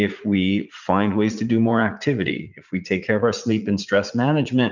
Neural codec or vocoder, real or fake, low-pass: vocoder, 44.1 kHz, 80 mel bands, Vocos; fake; 7.2 kHz